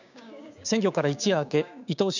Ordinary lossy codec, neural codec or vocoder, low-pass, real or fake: none; codec, 16 kHz, 6 kbps, DAC; 7.2 kHz; fake